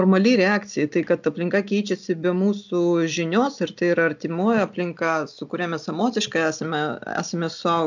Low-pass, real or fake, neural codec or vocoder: 7.2 kHz; real; none